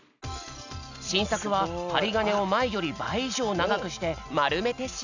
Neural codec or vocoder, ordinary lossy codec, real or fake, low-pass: none; none; real; 7.2 kHz